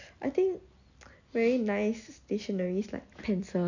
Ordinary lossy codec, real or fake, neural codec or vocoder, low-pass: none; real; none; 7.2 kHz